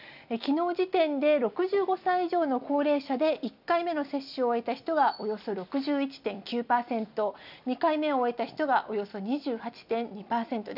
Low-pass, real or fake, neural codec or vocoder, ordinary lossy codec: 5.4 kHz; real; none; none